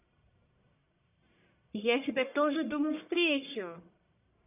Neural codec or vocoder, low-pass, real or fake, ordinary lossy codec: codec, 44.1 kHz, 1.7 kbps, Pupu-Codec; 3.6 kHz; fake; none